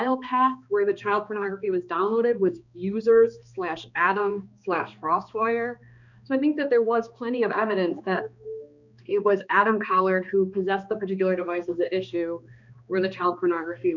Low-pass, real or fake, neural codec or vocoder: 7.2 kHz; fake; codec, 16 kHz, 2 kbps, X-Codec, HuBERT features, trained on balanced general audio